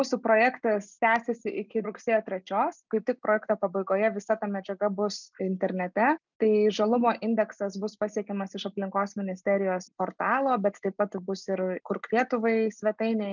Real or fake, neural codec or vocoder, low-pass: real; none; 7.2 kHz